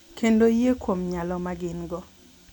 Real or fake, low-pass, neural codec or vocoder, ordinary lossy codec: real; 19.8 kHz; none; none